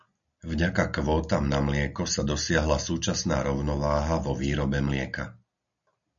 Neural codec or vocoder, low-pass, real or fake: none; 7.2 kHz; real